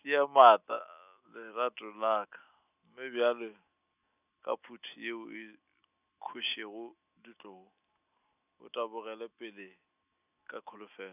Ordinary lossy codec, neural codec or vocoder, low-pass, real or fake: none; none; 3.6 kHz; real